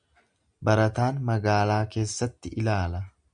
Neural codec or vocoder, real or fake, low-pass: none; real; 9.9 kHz